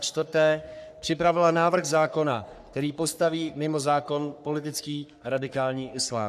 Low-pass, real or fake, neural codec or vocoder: 14.4 kHz; fake; codec, 44.1 kHz, 3.4 kbps, Pupu-Codec